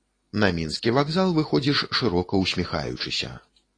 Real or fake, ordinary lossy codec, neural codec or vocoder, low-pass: real; AAC, 32 kbps; none; 9.9 kHz